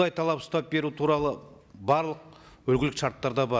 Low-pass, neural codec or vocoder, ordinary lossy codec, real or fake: none; none; none; real